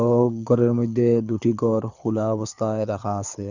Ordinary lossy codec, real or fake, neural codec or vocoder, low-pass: none; fake; codec, 24 kHz, 6 kbps, HILCodec; 7.2 kHz